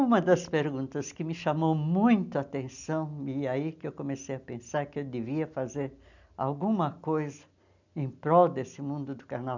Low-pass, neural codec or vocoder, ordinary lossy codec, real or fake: 7.2 kHz; none; none; real